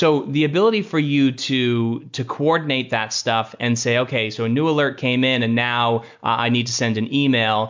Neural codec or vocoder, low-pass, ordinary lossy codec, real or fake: none; 7.2 kHz; MP3, 64 kbps; real